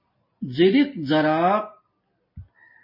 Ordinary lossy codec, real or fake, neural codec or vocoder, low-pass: MP3, 24 kbps; real; none; 5.4 kHz